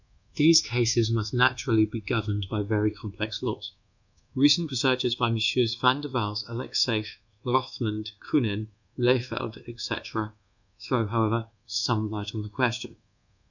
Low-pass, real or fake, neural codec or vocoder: 7.2 kHz; fake; codec, 24 kHz, 1.2 kbps, DualCodec